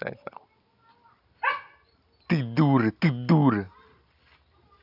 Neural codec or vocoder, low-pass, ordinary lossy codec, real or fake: none; 5.4 kHz; AAC, 48 kbps; real